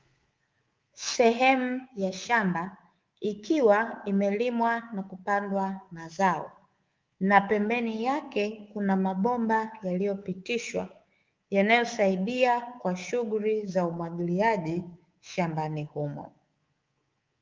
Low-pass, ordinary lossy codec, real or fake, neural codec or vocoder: 7.2 kHz; Opus, 32 kbps; fake; codec, 24 kHz, 3.1 kbps, DualCodec